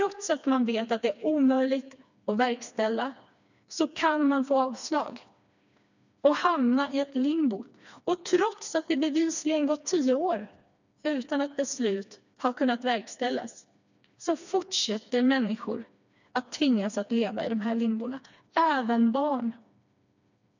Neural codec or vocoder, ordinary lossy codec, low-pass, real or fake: codec, 16 kHz, 2 kbps, FreqCodec, smaller model; none; 7.2 kHz; fake